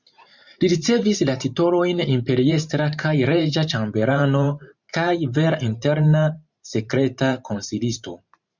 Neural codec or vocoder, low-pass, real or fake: vocoder, 44.1 kHz, 128 mel bands every 512 samples, BigVGAN v2; 7.2 kHz; fake